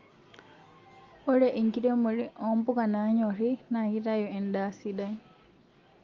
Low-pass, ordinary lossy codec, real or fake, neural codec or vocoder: 7.2 kHz; Opus, 32 kbps; real; none